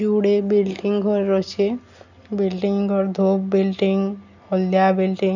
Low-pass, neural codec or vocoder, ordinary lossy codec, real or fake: 7.2 kHz; none; none; real